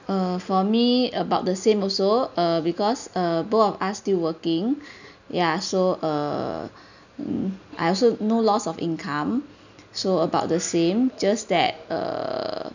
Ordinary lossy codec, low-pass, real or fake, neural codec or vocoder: none; 7.2 kHz; real; none